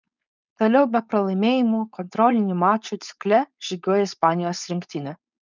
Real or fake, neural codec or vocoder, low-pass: fake; codec, 16 kHz, 4.8 kbps, FACodec; 7.2 kHz